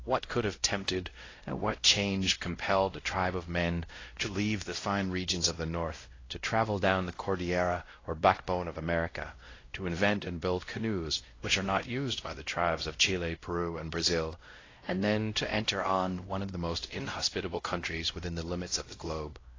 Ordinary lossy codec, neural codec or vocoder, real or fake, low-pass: AAC, 32 kbps; codec, 16 kHz, 0.5 kbps, X-Codec, WavLM features, trained on Multilingual LibriSpeech; fake; 7.2 kHz